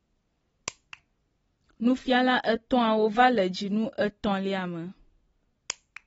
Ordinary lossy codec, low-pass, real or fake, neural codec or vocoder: AAC, 24 kbps; 19.8 kHz; real; none